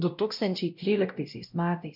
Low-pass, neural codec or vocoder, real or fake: 5.4 kHz; codec, 16 kHz, 0.5 kbps, X-Codec, WavLM features, trained on Multilingual LibriSpeech; fake